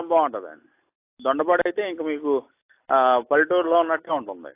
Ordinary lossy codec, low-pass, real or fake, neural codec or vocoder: AAC, 24 kbps; 3.6 kHz; real; none